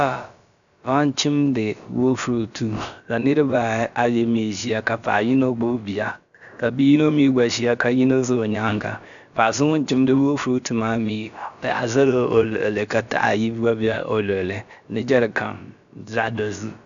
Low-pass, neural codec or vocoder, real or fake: 7.2 kHz; codec, 16 kHz, about 1 kbps, DyCAST, with the encoder's durations; fake